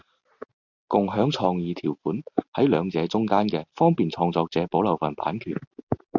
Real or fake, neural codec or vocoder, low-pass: real; none; 7.2 kHz